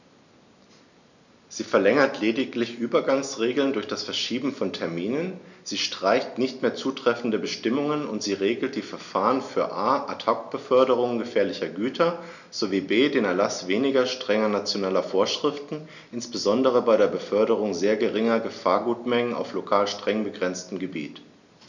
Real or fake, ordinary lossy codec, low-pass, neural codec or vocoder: real; none; 7.2 kHz; none